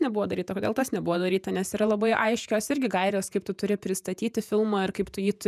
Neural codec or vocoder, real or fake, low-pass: vocoder, 48 kHz, 128 mel bands, Vocos; fake; 14.4 kHz